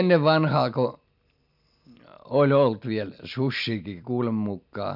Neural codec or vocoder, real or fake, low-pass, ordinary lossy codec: none; real; 5.4 kHz; none